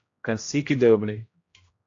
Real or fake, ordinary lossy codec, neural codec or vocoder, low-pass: fake; AAC, 48 kbps; codec, 16 kHz, 0.5 kbps, X-Codec, HuBERT features, trained on general audio; 7.2 kHz